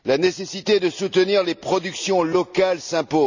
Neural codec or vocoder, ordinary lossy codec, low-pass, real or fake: none; none; 7.2 kHz; real